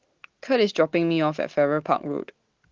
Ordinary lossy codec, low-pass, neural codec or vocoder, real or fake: Opus, 16 kbps; 7.2 kHz; none; real